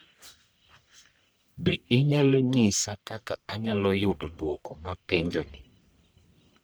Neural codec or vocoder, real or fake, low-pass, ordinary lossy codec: codec, 44.1 kHz, 1.7 kbps, Pupu-Codec; fake; none; none